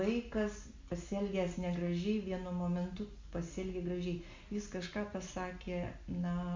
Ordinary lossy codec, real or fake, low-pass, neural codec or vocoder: MP3, 48 kbps; real; 7.2 kHz; none